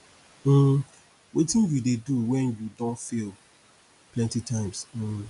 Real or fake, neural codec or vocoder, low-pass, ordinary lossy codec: real; none; 10.8 kHz; none